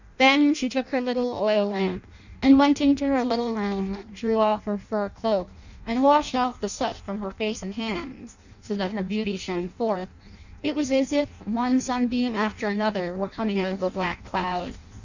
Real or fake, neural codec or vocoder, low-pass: fake; codec, 16 kHz in and 24 kHz out, 0.6 kbps, FireRedTTS-2 codec; 7.2 kHz